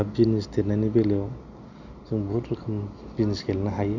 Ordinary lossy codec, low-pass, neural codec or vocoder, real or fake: none; 7.2 kHz; none; real